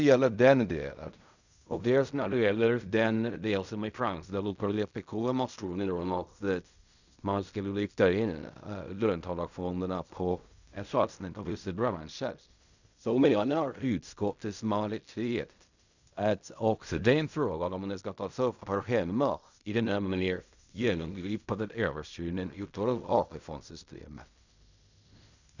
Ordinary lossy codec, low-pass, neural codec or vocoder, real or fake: none; 7.2 kHz; codec, 16 kHz in and 24 kHz out, 0.4 kbps, LongCat-Audio-Codec, fine tuned four codebook decoder; fake